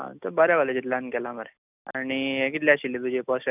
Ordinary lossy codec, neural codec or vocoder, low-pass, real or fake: none; none; 3.6 kHz; real